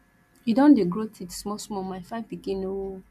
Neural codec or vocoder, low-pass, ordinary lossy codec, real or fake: none; 14.4 kHz; MP3, 96 kbps; real